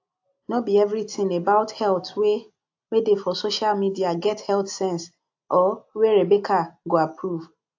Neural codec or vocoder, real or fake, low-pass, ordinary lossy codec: none; real; 7.2 kHz; AAC, 48 kbps